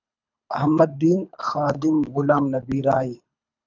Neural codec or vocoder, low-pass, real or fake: codec, 24 kHz, 3 kbps, HILCodec; 7.2 kHz; fake